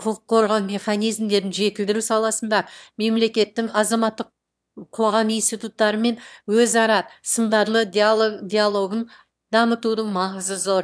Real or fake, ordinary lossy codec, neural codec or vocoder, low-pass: fake; none; autoencoder, 22.05 kHz, a latent of 192 numbers a frame, VITS, trained on one speaker; none